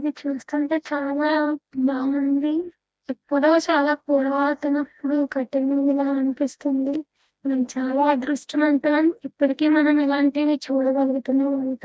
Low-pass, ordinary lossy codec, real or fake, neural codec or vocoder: none; none; fake; codec, 16 kHz, 1 kbps, FreqCodec, smaller model